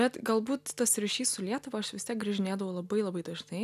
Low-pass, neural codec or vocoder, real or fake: 14.4 kHz; none; real